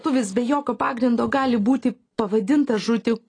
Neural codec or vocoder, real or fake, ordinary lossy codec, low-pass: none; real; AAC, 32 kbps; 9.9 kHz